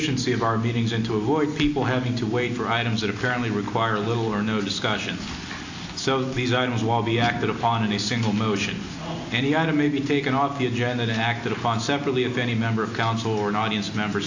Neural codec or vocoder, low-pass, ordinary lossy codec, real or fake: none; 7.2 kHz; AAC, 48 kbps; real